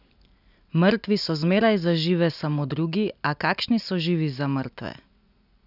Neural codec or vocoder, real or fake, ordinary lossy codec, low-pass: none; real; none; 5.4 kHz